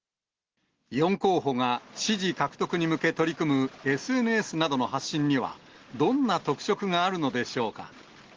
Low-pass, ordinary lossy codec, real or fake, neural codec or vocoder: 7.2 kHz; Opus, 16 kbps; real; none